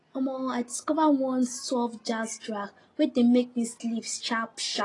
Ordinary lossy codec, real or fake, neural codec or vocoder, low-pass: AAC, 32 kbps; real; none; 10.8 kHz